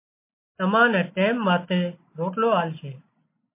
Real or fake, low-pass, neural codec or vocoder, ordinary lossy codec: real; 3.6 kHz; none; MP3, 24 kbps